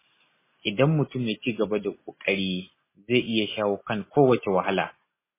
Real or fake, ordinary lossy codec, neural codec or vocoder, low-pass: real; MP3, 16 kbps; none; 3.6 kHz